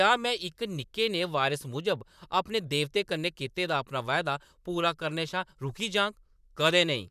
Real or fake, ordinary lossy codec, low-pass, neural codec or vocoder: fake; none; 14.4 kHz; vocoder, 44.1 kHz, 128 mel bands, Pupu-Vocoder